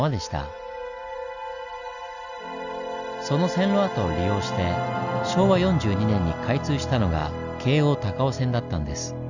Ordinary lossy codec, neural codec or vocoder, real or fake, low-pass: none; none; real; 7.2 kHz